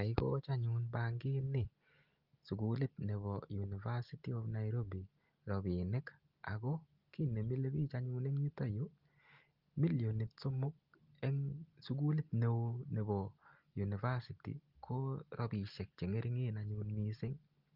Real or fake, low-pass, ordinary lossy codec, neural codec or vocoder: real; 5.4 kHz; Opus, 32 kbps; none